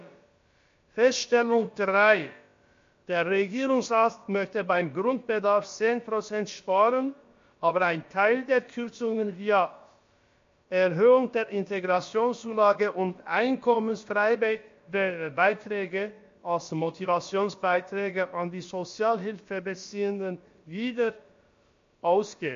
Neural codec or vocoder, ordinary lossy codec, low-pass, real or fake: codec, 16 kHz, about 1 kbps, DyCAST, with the encoder's durations; MP3, 48 kbps; 7.2 kHz; fake